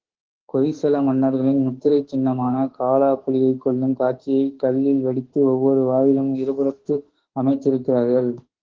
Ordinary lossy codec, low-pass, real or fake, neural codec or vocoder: Opus, 16 kbps; 7.2 kHz; fake; autoencoder, 48 kHz, 32 numbers a frame, DAC-VAE, trained on Japanese speech